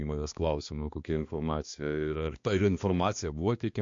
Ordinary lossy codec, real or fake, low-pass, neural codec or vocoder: MP3, 48 kbps; fake; 7.2 kHz; codec, 16 kHz, 2 kbps, X-Codec, HuBERT features, trained on balanced general audio